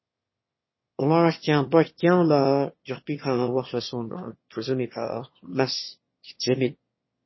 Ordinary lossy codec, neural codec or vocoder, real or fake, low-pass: MP3, 24 kbps; autoencoder, 22.05 kHz, a latent of 192 numbers a frame, VITS, trained on one speaker; fake; 7.2 kHz